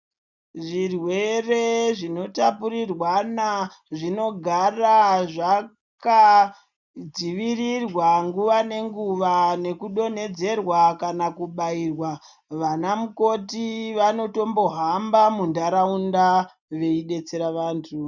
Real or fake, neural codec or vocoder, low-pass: real; none; 7.2 kHz